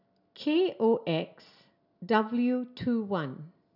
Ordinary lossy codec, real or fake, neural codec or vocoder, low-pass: none; real; none; 5.4 kHz